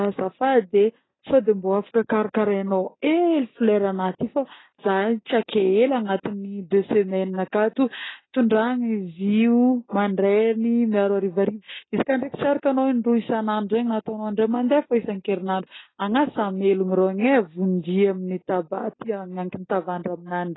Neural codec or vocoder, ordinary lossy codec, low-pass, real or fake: none; AAC, 16 kbps; 7.2 kHz; real